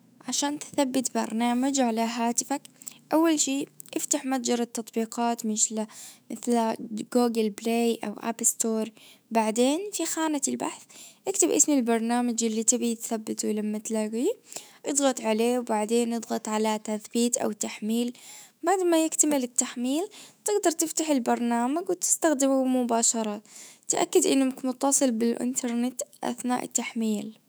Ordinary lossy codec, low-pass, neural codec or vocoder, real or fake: none; none; autoencoder, 48 kHz, 128 numbers a frame, DAC-VAE, trained on Japanese speech; fake